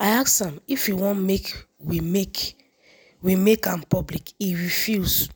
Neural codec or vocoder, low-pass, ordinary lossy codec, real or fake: none; none; none; real